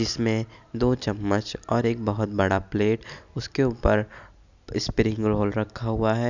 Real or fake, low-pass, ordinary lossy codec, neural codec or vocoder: real; 7.2 kHz; none; none